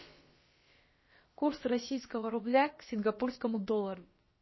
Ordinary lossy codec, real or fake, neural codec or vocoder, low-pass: MP3, 24 kbps; fake; codec, 16 kHz, about 1 kbps, DyCAST, with the encoder's durations; 7.2 kHz